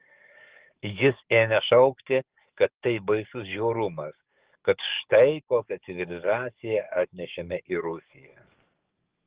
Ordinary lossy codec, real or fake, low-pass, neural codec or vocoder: Opus, 16 kbps; fake; 3.6 kHz; codec, 44.1 kHz, 7.8 kbps, DAC